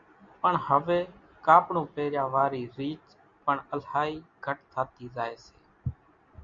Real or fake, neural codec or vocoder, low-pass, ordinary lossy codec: real; none; 7.2 kHz; Opus, 64 kbps